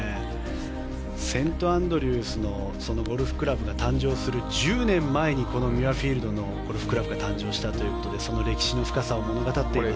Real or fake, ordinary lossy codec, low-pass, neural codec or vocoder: real; none; none; none